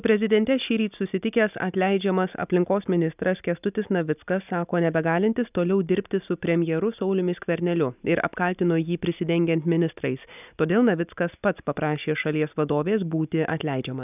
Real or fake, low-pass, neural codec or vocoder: real; 3.6 kHz; none